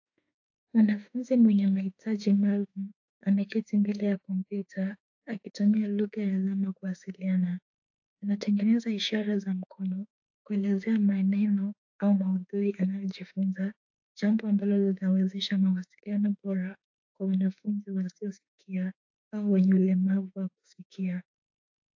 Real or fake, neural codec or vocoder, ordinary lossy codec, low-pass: fake; autoencoder, 48 kHz, 32 numbers a frame, DAC-VAE, trained on Japanese speech; AAC, 48 kbps; 7.2 kHz